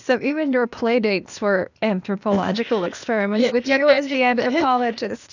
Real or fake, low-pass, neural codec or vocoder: fake; 7.2 kHz; codec, 16 kHz, 0.8 kbps, ZipCodec